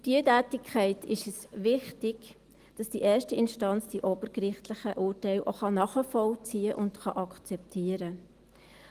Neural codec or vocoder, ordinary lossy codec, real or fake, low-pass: none; Opus, 24 kbps; real; 14.4 kHz